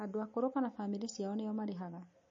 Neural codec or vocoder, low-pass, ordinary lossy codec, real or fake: none; 7.2 kHz; MP3, 32 kbps; real